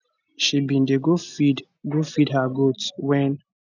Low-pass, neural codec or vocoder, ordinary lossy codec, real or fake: 7.2 kHz; none; none; real